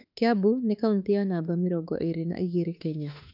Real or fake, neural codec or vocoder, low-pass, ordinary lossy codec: fake; autoencoder, 48 kHz, 32 numbers a frame, DAC-VAE, trained on Japanese speech; 5.4 kHz; none